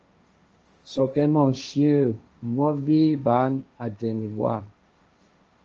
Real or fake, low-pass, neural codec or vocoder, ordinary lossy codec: fake; 7.2 kHz; codec, 16 kHz, 1.1 kbps, Voila-Tokenizer; Opus, 24 kbps